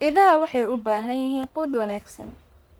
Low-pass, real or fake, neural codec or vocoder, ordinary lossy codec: none; fake; codec, 44.1 kHz, 1.7 kbps, Pupu-Codec; none